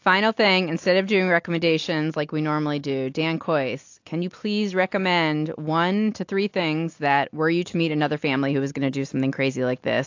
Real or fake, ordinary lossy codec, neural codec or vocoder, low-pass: real; AAC, 48 kbps; none; 7.2 kHz